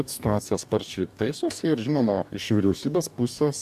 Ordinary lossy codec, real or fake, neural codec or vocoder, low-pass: AAC, 96 kbps; fake; codec, 44.1 kHz, 2.6 kbps, DAC; 14.4 kHz